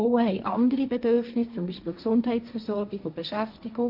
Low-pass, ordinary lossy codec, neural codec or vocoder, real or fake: 5.4 kHz; none; codec, 16 kHz, 1.1 kbps, Voila-Tokenizer; fake